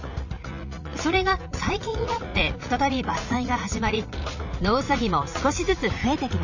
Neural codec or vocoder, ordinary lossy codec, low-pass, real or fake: vocoder, 44.1 kHz, 80 mel bands, Vocos; none; 7.2 kHz; fake